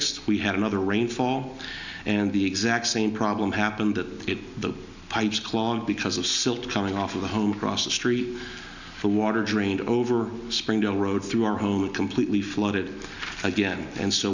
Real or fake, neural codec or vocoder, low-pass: real; none; 7.2 kHz